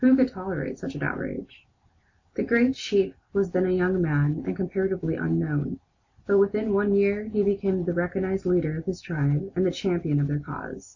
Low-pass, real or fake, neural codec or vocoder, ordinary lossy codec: 7.2 kHz; real; none; AAC, 48 kbps